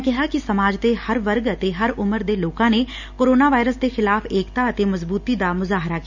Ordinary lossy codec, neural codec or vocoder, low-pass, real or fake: none; none; 7.2 kHz; real